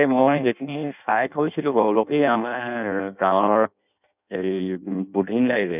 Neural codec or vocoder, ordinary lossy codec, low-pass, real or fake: codec, 16 kHz in and 24 kHz out, 0.6 kbps, FireRedTTS-2 codec; none; 3.6 kHz; fake